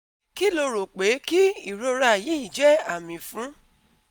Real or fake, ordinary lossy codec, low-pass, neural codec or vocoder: real; none; none; none